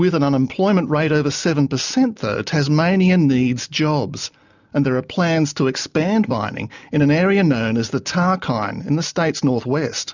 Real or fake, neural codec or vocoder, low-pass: real; none; 7.2 kHz